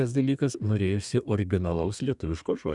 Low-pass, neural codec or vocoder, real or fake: 10.8 kHz; codec, 32 kHz, 1.9 kbps, SNAC; fake